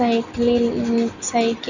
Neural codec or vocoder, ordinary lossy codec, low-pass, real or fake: none; none; 7.2 kHz; real